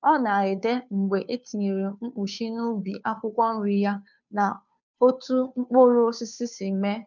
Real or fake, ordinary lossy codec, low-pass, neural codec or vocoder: fake; none; 7.2 kHz; codec, 16 kHz, 2 kbps, FunCodec, trained on Chinese and English, 25 frames a second